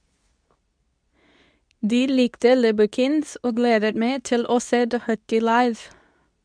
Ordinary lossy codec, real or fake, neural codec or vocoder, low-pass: none; fake; codec, 24 kHz, 0.9 kbps, WavTokenizer, medium speech release version 2; 9.9 kHz